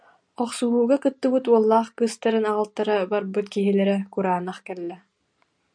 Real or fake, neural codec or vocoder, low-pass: real; none; 9.9 kHz